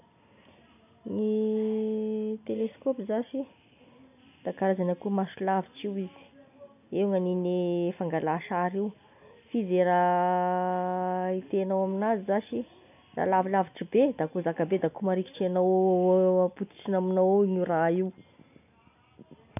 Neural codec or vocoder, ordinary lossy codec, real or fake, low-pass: none; none; real; 3.6 kHz